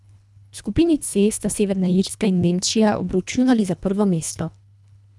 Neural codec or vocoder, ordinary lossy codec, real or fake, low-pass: codec, 24 kHz, 1.5 kbps, HILCodec; none; fake; none